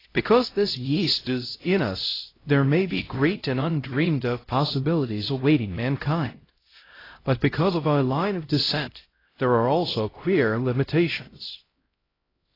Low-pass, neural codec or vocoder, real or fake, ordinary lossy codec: 5.4 kHz; codec, 16 kHz, 0.5 kbps, X-Codec, HuBERT features, trained on LibriSpeech; fake; AAC, 24 kbps